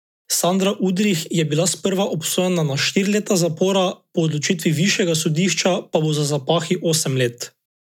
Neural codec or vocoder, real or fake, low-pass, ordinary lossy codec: none; real; 19.8 kHz; none